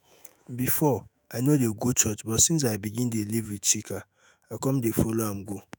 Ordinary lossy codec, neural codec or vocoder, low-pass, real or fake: none; autoencoder, 48 kHz, 128 numbers a frame, DAC-VAE, trained on Japanese speech; none; fake